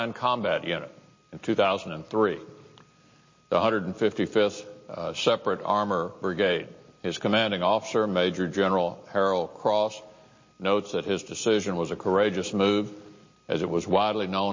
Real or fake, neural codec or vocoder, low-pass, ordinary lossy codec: real; none; 7.2 kHz; MP3, 32 kbps